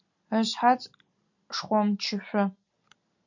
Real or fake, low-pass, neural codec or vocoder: real; 7.2 kHz; none